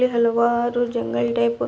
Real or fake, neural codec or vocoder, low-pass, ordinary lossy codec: real; none; none; none